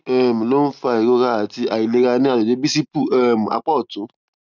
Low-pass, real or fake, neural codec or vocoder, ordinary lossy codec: 7.2 kHz; real; none; none